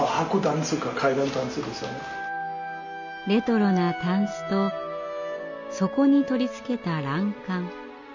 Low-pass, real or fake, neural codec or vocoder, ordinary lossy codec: 7.2 kHz; real; none; none